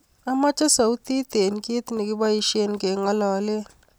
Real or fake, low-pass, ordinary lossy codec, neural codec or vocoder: real; none; none; none